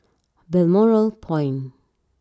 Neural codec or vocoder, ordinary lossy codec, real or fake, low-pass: none; none; real; none